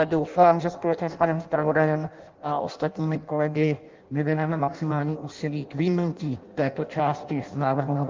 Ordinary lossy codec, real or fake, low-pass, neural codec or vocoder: Opus, 24 kbps; fake; 7.2 kHz; codec, 16 kHz in and 24 kHz out, 0.6 kbps, FireRedTTS-2 codec